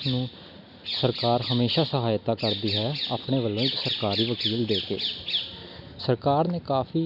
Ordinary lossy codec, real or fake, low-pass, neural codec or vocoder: none; real; 5.4 kHz; none